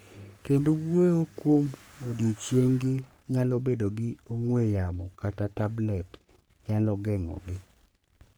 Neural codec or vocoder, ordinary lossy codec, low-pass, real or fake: codec, 44.1 kHz, 3.4 kbps, Pupu-Codec; none; none; fake